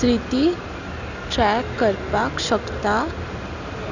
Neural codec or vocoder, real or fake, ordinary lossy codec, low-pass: none; real; none; 7.2 kHz